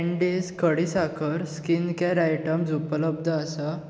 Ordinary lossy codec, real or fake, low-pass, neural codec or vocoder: none; real; none; none